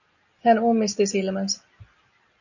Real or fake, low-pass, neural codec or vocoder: real; 7.2 kHz; none